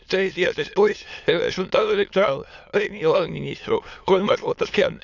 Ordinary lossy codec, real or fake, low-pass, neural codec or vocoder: none; fake; 7.2 kHz; autoencoder, 22.05 kHz, a latent of 192 numbers a frame, VITS, trained on many speakers